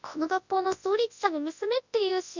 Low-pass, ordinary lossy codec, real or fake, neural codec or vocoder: 7.2 kHz; none; fake; codec, 24 kHz, 0.9 kbps, WavTokenizer, large speech release